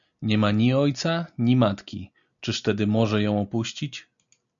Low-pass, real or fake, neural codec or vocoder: 7.2 kHz; real; none